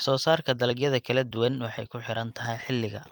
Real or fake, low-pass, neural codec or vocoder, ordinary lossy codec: fake; 19.8 kHz; vocoder, 44.1 kHz, 128 mel bands every 256 samples, BigVGAN v2; Opus, 32 kbps